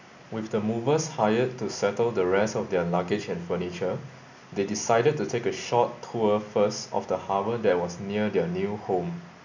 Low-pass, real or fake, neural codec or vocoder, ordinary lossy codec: 7.2 kHz; real; none; none